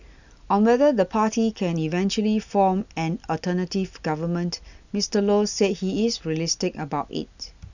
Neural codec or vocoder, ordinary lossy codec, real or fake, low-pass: none; none; real; 7.2 kHz